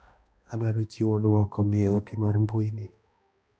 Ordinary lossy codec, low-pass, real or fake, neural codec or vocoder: none; none; fake; codec, 16 kHz, 1 kbps, X-Codec, HuBERT features, trained on balanced general audio